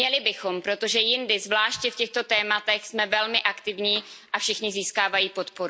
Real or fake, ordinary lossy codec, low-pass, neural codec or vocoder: real; none; none; none